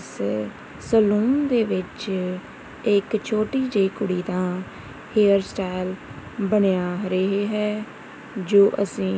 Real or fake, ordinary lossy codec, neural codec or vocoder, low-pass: real; none; none; none